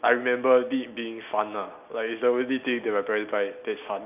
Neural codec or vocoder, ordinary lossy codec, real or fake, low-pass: none; none; real; 3.6 kHz